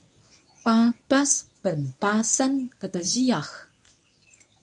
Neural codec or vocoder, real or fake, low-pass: codec, 24 kHz, 0.9 kbps, WavTokenizer, medium speech release version 1; fake; 10.8 kHz